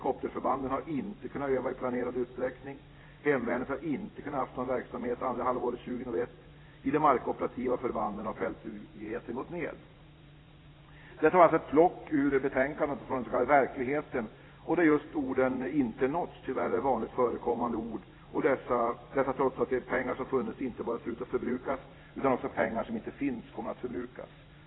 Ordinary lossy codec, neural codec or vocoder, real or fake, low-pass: AAC, 16 kbps; vocoder, 44.1 kHz, 80 mel bands, Vocos; fake; 7.2 kHz